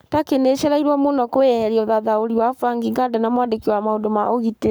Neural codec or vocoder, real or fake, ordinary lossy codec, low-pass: codec, 44.1 kHz, 7.8 kbps, Pupu-Codec; fake; none; none